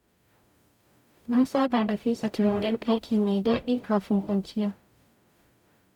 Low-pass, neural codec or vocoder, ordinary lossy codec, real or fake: 19.8 kHz; codec, 44.1 kHz, 0.9 kbps, DAC; none; fake